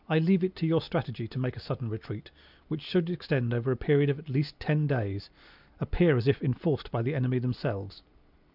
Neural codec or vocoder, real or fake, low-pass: none; real; 5.4 kHz